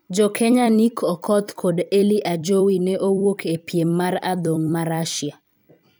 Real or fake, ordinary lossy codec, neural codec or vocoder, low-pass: fake; none; vocoder, 44.1 kHz, 128 mel bands every 256 samples, BigVGAN v2; none